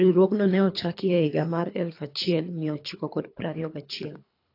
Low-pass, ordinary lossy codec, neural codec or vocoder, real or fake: 5.4 kHz; AAC, 32 kbps; codec, 24 kHz, 3 kbps, HILCodec; fake